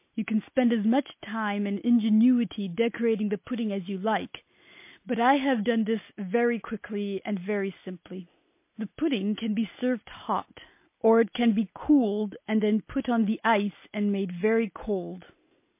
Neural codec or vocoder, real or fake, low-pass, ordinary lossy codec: none; real; 3.6 kHz; MP3, 24 kbps